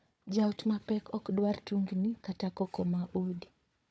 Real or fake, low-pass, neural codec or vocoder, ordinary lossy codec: fake; none; codec, 16 kHz, 4 kbps, FunCodec, trained on Chinese and English, 50 frames a second; none